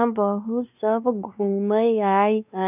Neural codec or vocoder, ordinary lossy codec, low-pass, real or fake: codec, 16 kHz, 2 kbps, X-Codec, WavLM features, trained on Multilingual LibriSpeech; none; 3.6 kHz; fake